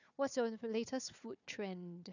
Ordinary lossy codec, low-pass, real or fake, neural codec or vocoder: none; 7.2 kHz; fake; codec, 16 kHz, 4 kbps, FunCodec, trained on Chinese and English, 50 frames a second